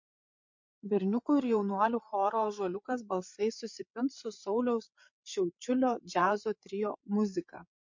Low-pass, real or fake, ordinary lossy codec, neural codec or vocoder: 7.2 kHz; fake; MP3, 48 kbps; codec, 16 kHz, 8 kbps, FreqCodec, larger model